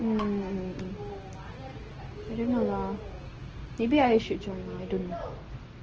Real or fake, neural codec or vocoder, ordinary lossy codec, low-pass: real; none; Opus, 16 kbps; 7.2 kHz